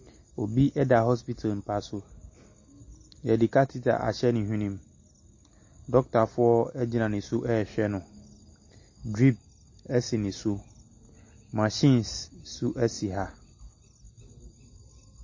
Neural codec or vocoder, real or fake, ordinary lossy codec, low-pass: none; real; MP3, 32 kbps; 7.2 kHz